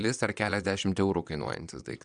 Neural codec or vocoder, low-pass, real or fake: vocoder, 22.05 kHz, 80 mel bands, Vocos; 9.9 kHz; fake